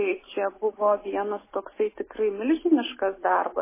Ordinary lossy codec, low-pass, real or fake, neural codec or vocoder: MP3, 16 kbps; 3.6 kHz; real; none